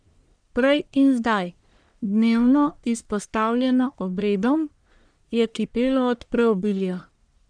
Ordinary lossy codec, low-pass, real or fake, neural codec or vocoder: none; 9.9 kHz; fake; codec, 44.1 kHz, 1.7 kbps, Pupu-Codec